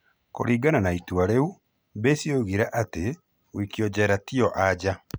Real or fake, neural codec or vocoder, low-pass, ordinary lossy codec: real; none; none; none